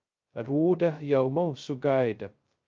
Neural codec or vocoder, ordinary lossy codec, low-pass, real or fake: codec, 16 kHz, 0.2 kbps, FocalCodec; Opus, 24 kbps; 7.2 kHz; fake